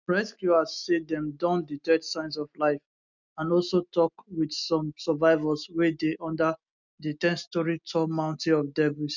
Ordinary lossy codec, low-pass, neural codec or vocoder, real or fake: none; 7.2 kHz; none; real